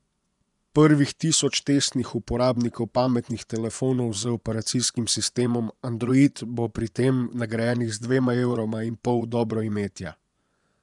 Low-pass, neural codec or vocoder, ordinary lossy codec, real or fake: 10.8 kHz; vocoder, 24 kHz, 100 mel bands, Vocos; none; fake